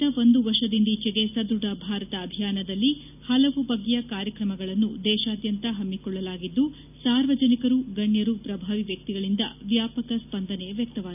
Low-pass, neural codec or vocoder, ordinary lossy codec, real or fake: 3.6 kHz; none; none; real